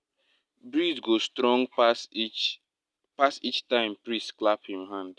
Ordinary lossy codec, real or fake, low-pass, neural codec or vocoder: none; real; none; none